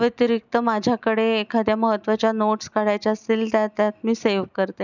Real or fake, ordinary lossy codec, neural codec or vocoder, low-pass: real; none; none; 7.2 kHz